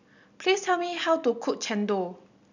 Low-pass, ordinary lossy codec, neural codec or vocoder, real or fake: 7.2 kHz; none; none; real